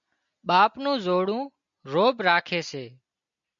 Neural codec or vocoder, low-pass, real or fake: none; 7.2 kHz; real